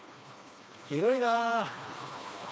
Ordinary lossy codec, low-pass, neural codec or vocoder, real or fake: none; none; codec, 16 kHz, 2 kbps, FreqCodec, smaller model; fake